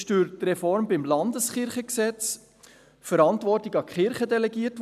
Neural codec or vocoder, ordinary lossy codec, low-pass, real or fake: none; none; 14.4 kHz; real